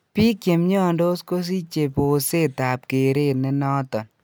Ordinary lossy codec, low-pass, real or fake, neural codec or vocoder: none; none; real; none